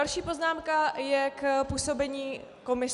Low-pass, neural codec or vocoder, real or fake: 10.8 kHz; none; real